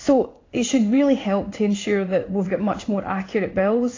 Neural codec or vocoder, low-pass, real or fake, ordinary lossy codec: codec, 16 kHz in and 24 kHz out, 1 kbps, XY-Tokenizer; 7.2 kHz; fake; AAC, 32 kbps